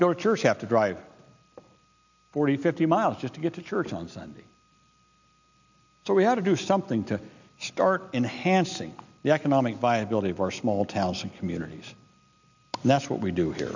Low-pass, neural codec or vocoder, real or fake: 7.2 kHz; none; real